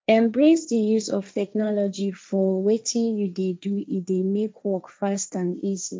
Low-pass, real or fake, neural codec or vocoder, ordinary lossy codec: none; fake; codec, 16 kHz, 1.1 kbps, Voila-Tokenizer; none